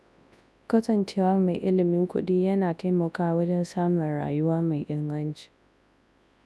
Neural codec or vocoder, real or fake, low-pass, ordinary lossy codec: codec, 24 kHz, 0.9 kbps, WavTokenizer, large speech release; fake; none; none